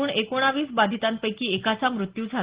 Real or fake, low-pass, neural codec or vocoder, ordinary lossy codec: real; 3.6 kHz; none; Opus, 16 kbps